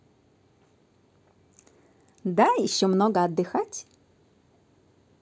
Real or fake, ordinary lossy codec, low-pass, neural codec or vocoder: real; none; none; none